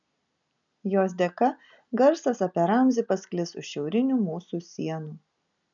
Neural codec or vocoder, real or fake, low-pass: none; real; 7.2 kHz